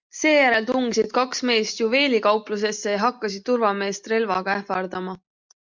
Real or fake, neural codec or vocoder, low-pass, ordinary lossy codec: real; none; 7.2 kHz; MP3, 64 kbps